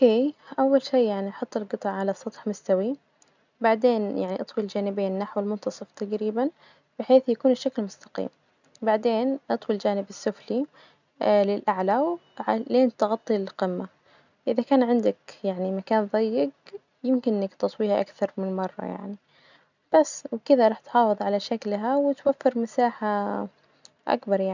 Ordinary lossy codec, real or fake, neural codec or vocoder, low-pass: none; real; none; 7.2 kHz